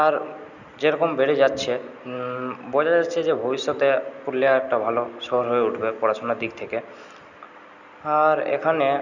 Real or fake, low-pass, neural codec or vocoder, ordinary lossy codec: real; 7.2 kHz; none; none